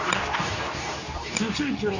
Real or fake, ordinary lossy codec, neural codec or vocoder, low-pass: fake; none; codec, 24 kHz, 0.9 kbps, WavTokenizer, medium speech release version 2; 7.2 kHz